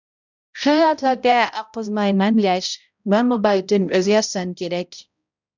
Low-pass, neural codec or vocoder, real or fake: 7.2 kHz; codec, 16 kHz, 0.5 kbps, X-Codec, HuBERT features, trained on balanced general audio; fake